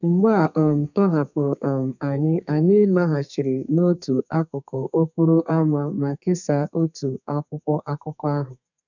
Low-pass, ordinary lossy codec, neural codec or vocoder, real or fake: 7.2 kHz; none; codec, 32 kHz, 1.9 kbps, SNAC; fake